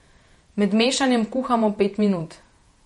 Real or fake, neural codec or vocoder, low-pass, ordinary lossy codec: fake; vocoder, 48 kHz, 128 mel bands, Vocos; 19.8 kHz; MP3, 48 kbps